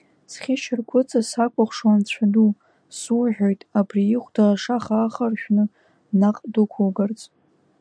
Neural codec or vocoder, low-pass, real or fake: none; 9.9 kHz; real